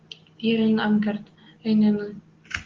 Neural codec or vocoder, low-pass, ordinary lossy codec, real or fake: none; 7.2 kHz; Opus, 16 kbps; real